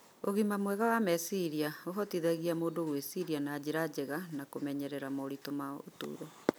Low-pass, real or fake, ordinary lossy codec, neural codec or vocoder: none; real; none; none